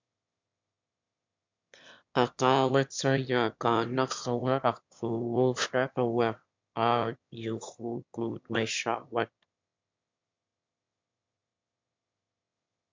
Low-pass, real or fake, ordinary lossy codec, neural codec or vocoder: 7.2 kHz; fake; MP3, 64 kbps; autoencoder, 22.05 kHz, a latent of 192 numbers a frame, VITS, trained on one speaker